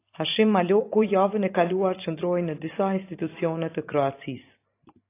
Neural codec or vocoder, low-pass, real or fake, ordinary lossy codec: vocoder, 44.1 kHz, 128 mel bands every 512 samples, BigVGAN v2; 3.6 kHz; fake; AAC, 24 kbps